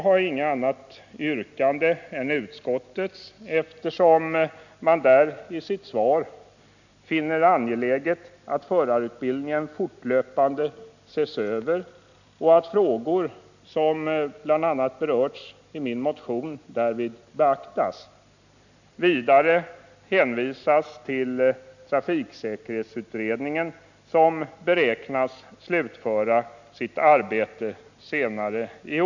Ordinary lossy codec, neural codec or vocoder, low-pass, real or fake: none; none; 7.2 kHz; real